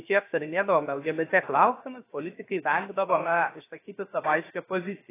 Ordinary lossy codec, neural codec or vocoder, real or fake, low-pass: AAC, 16 kbps; codec, 16 kHz, 0.7 kbps, FocalCodec; fake; 3.6 kHz